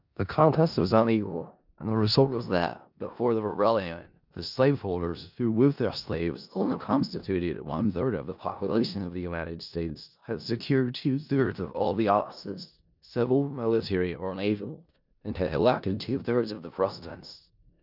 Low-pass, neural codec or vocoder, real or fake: 5.4 kHz; codec, 16 kHz in and 24 kHz out, 0.4 kbps, LongCat-Audio-Codec, four codebook decoder; fake